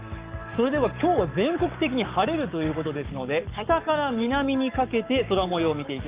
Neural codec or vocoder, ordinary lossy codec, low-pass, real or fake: codec, 44.1 kHz, 7.8 kbps, Pupu-Codec; Opus, 24 kbps; 3.6 kHz; fake